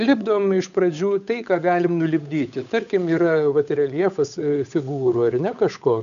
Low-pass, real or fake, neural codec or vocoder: 7.2 kHz; fake; codec, 16 kHz, 8 kbps, FunCodec, trained on Chinese and English, 25 frames a second